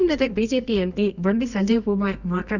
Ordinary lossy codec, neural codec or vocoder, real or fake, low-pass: none; codec, 24 kHz, 0.9 kbps, WavTokenizer, medium music audio release; fake; 7.2 kHz